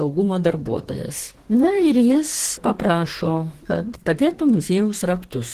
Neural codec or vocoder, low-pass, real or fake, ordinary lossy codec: codec, 32 kHz, 1.9 kbps, SNAC; 14.4 kHz; fake; Opus, 16 kbps